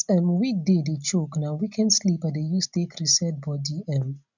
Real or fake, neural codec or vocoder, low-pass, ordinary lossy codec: real; none; 7.2 kHz; none